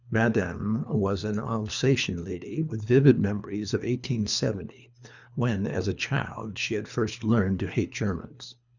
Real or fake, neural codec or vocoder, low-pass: fake; codec, 24 kHz, 3 kbps, HILCodec; 7.2 kHz